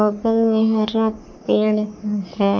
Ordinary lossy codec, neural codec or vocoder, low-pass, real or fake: none; codec, 44.1 kHz, 3.4 kbps, Pupu-Codec; 7.2 kHz; fake